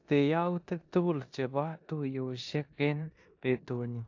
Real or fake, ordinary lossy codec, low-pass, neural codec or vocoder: fake; AAC, 48 kbps; 7.2 kHz; codec, 16 kHz in and 24 kHz out, 0.9 kbps, LongCat-Audio-Codec, four codebook decoder